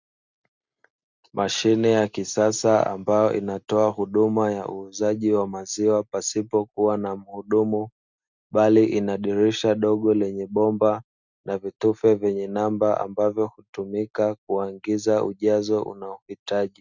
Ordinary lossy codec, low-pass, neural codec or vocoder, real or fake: Opus, 64 kbps; 7.2 kHz; none; real